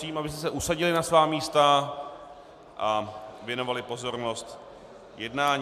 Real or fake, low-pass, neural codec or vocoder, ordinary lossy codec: real; 14.4 kHz; none; MP3, 96 kbps